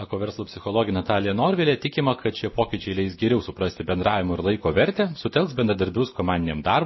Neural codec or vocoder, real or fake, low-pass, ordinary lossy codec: none; real; 7.2 kHz; MP3, 24 kbps